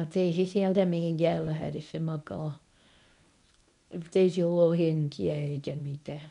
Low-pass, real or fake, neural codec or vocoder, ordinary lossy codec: 10.8 kHz; fake; codec, 24 kHz, 0.9 kbps, WavTokenizer, medium speech release version 1; none